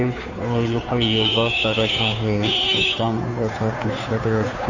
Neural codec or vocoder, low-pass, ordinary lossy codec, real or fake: codec, 16 kHz, 2 kbps, FunCodec, trained on Chinese and English, 25 frames a second; 7.2 kHz; none; fake